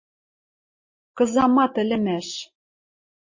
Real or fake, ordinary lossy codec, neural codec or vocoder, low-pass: real; MP3, 32 kbps; none; 7.2 kHz